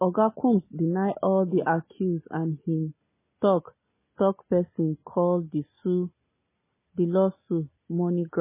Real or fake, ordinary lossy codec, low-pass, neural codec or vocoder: real; MP3, 16 kbps; 3.6 kHz; none